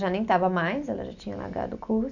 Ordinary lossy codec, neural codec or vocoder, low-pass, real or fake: none; none; 7.2 kHz; real